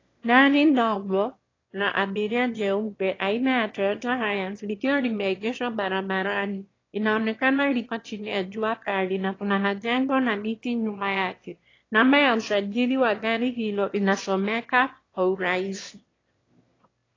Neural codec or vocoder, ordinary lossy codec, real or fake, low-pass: autoencoder, 22.05 kHz, a latent of 192 numbers a frame, VITS, trained on one speaker; AAC, 32 kbps; fake; 7.2 kHz